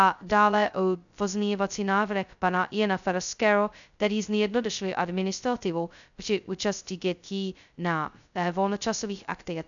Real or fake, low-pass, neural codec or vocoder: fake; 7.2 kHz; codec, 16 kHz, 0.2 kbps, FocalCodec